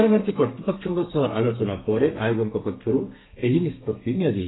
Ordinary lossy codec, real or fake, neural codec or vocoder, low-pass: AAC, 16 kbps; fake; codec, 32 kHz, 1.9 kbps, SNAC; 7.2 kHz